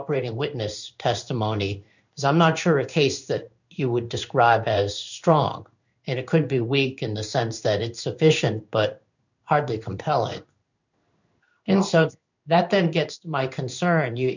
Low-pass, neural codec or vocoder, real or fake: 7.2 kHz; codec, 16 kHz in and 24 kHz out, 1 kbps, XY-Tokenizer; fake